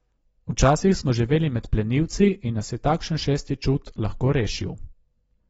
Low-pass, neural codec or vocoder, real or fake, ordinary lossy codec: 10.8 kHz; none; real; AAC, 24 kbps